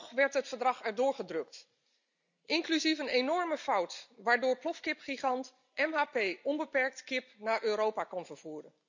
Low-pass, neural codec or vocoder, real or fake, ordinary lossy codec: 7.2 kHz; none; real; none